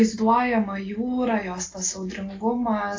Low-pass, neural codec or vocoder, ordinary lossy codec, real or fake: 7.2 kHz; none; AAC, 32 kbps; real